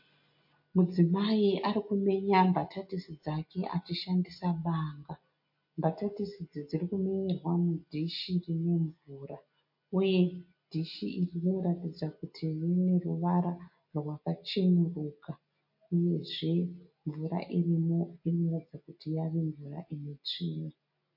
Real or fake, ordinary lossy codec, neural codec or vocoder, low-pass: real; MP3, 32 kbps; none; 5.4 kHz